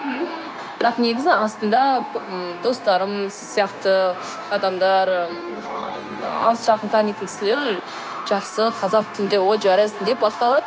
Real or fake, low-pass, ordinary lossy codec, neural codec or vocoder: fake; none; none; codec, 16 kHz, 0.9 kbps, LongCat-Audio-Codec